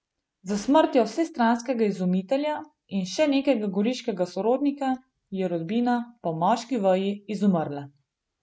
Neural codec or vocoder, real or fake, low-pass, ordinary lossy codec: none; real; none; none